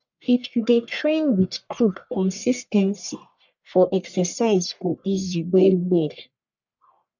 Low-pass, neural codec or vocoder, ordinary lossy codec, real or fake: 7.2 kHz; codec, 44.1 kHz, 1.7 kbps, Pupu-Codec; none; fake